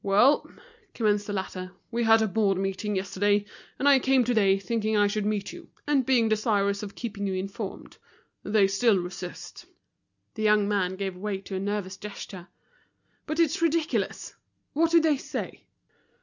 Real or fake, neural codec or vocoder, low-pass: real; none; 7.2 kHz